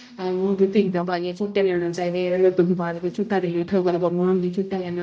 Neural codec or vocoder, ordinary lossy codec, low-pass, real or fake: codec, 16 kHz, 0.5 kbps, X-Codec, HuBERT features, trained on general audio; none; none; fake